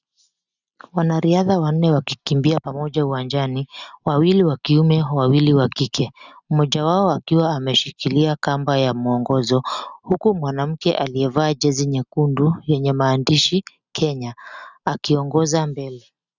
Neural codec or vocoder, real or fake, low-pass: none; real; 7.2 kHz